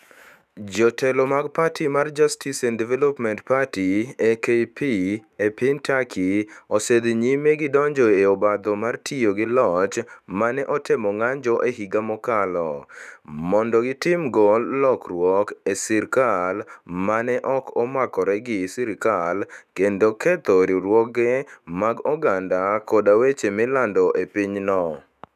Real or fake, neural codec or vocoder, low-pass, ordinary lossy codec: fake; autoencoder, 48 kHz, 128 numbers a frame, DAC-VAE, trained on Japanese speech; 14.4 kHz; none